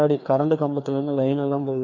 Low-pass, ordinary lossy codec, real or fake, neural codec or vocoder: 7.2 kHz; none; fake; codec, 16 kHz, 2 kbps, FreqCodec, larger model